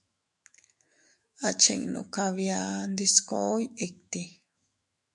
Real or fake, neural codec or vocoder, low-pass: fake; autoencoder, 48 kHz, 128 numbers a frame, DAC-VAE, trained on Japanese speech; 10.8 kHz